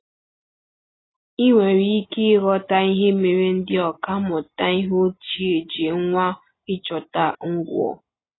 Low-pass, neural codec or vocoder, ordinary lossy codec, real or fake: 7.2 kHz; none; AAC, 16 kbps; real